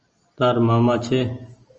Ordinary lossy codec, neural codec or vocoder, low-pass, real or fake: Opus, 24 kbps; none; 7.2 kHz; real